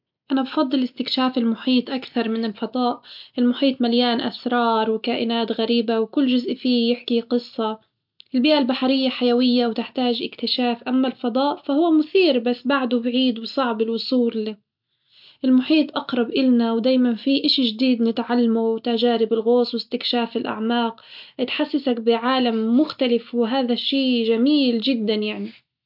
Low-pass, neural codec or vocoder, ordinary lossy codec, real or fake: 5.4 kHz; none; MP3, 48 kbps; real